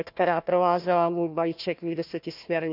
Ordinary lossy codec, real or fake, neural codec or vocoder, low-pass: none; fake; codec, 16 kHz, 1 kbps, FunCodec, trained on Chinese and English, 50 frames a second; 5.4 kHz